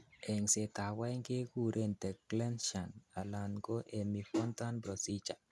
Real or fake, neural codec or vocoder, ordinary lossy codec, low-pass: real; none; none; none